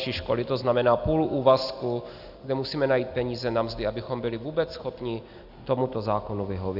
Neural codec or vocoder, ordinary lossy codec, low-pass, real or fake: none; AAC, 48 kbps; 5.4 kHz; real